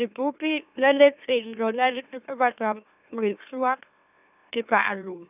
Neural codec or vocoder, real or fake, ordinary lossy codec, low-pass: autoencoder, 44.1 kHz, a latent of 192 numbers a frame, MeloTTS; fake; none; 3.6 kHz